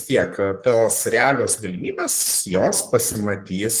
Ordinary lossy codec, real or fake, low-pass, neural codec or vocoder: Opus, 24 kbps; fake; 14.4 kHz; codec, 44.1 kHz, 3.4 kbps, Pupu-Codec